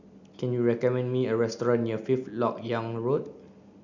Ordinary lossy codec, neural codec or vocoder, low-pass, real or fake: none; none; 7.2 kHz; real